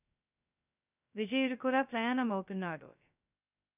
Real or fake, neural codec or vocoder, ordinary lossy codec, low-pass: fake; codec, 16 kHz, 0.2 kbps, FocalCodec; none; 3.6 kHz